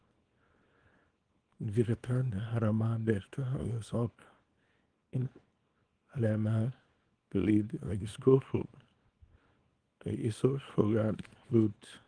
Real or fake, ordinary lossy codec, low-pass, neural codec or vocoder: fake; Opus, 24 kbps; 9.9 kHz; codec, 24 kHz, 0.9 kbps, WavTokenizer, small release